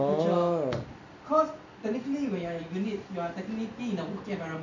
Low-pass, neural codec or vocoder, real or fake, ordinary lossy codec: 7.2 kHz; none; real; none